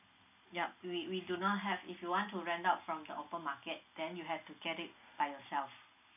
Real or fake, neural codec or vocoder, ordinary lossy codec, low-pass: real; none; none; 3.6 kHz